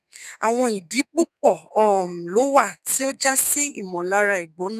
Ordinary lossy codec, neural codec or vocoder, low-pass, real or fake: none; codec, 32 kHz, 1.9 kbps, SNAC; 14.4 kHz; fake